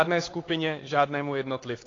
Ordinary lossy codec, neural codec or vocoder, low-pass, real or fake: AAC, 32 kbps; codec, 16 kHz, 4 kbps, X-Codec, HuBERT features, trained on LibriSpeech; 7.2 kHz; fake